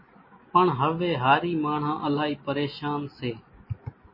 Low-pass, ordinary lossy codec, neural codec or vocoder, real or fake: 5.4 kHz; MP3, 24 kbps; none; real